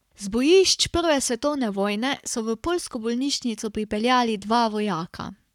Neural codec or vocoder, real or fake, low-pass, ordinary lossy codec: codec, 44.1 kHz, 7.8 kbps, Pupu-Codec; fake; 19.8 kHz; none